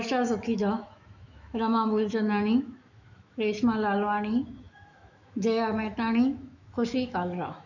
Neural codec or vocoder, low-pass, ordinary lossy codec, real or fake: autoencoder, 48 kHz, 128 numbers a frame, DAC-VAE, trained on Japanese speech; 7.2 kHz; none; fake